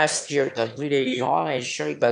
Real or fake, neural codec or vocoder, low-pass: fake; autoencoder, 22.05 kHz, a latent of 192 numbers a frame, VITS, trained on one speaker; 9.9 kHz